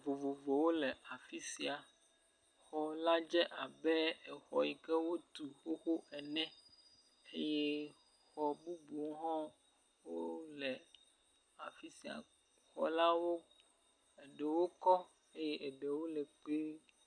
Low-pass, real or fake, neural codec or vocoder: 9.9 kHz; real; none